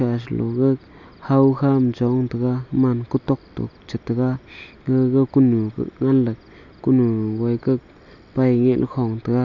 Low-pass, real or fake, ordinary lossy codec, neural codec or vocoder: 7.2 kHz; real; none; none